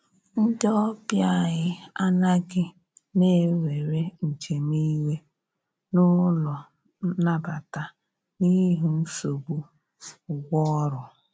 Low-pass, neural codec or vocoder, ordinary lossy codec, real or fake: none; none; none; real